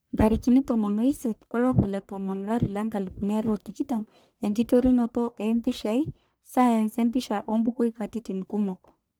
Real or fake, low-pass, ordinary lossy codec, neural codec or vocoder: fake; none; none; codec, 44.1 kHz, 1.7 kbps, Pupu-Codec